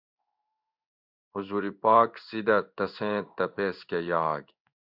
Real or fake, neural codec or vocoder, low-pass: fake; codec, 16 kHz in and 24 kHz out, 1 kbps, XY-Tokenizer; 5.4 kHz